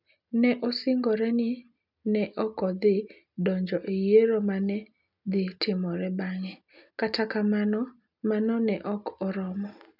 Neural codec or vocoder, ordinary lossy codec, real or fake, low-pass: none; none; real; 5.4 kHz